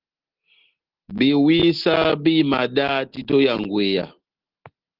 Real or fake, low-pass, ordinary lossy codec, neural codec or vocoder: real; 5.4 kHz; Opus, 16 kbps; none